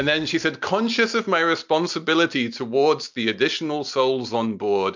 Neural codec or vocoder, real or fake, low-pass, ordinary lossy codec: none; real; 7.2 kHz; MP3, 64 kbps